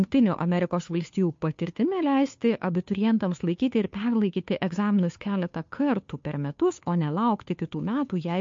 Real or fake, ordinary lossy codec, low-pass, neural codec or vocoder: fake; MP3, 48 kbps; 7.2 kHz; codec, 16 kHz, 2 kbps, FunCodec, trained on Chinese and English, 25 frames a second